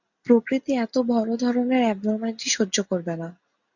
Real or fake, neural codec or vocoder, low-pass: real; none; 7.2 kHz